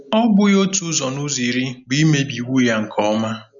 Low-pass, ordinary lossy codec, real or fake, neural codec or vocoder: 7.2 kHz; none; real; none